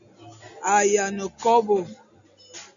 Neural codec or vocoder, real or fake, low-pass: none; real; 7.2 kHz